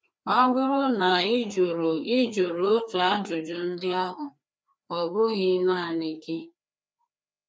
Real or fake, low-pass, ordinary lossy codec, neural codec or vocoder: fake; none; none; codec, 16 kHz, 2 kbps, FreqCodec, larger model